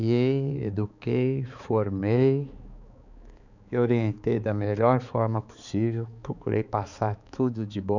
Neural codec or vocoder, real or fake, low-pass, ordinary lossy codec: codec, 16 kHz, 4 kbps, X-Codec, HuBERT features, trained on balanced general audio; fake; 7.2 kHz; none